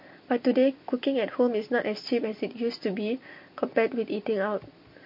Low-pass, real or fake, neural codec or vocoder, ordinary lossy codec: 5.4 kHz; fake; vocoder, 44.1 kHz, 128 mel bands every 256 samples, BigVGAN v2; MP3, 32 kbps